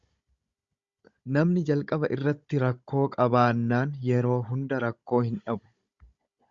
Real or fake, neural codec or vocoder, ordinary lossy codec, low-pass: fake; codec, 16 kHz, 16 kbps, FunCodec, trained on Chinese and English, 50 frames a second; Opus, 64 kbps; 7.2 kHz